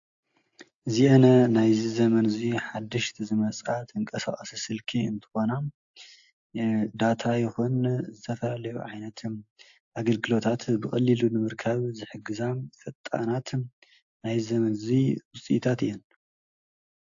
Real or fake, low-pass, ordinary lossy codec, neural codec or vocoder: real; 7.2 kHz; AAC, 64 kbps; none